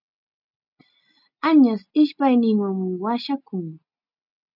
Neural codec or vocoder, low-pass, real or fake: none; 5.4 kHz; real